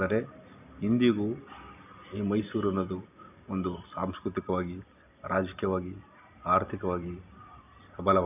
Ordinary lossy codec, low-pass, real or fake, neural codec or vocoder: none; 3.6 kHz; real; none